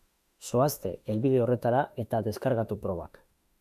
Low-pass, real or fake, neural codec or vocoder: 14.4 kHz; fake; autoencoder, 48 kHz, 32 numbers a frame, DAC-VAE, trained on Japanese speech